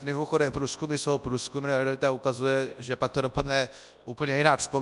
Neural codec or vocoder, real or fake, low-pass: codec, 24 kHz, 0.9 kbps, WavTokenizer, large speech release; fake; 10.8 kHz